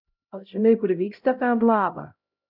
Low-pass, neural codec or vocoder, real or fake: 5.4 kHz; codec, 16 kHz, 0.5 kbps, X-Codec, HuBERT features, trained on LibriSpeech; fake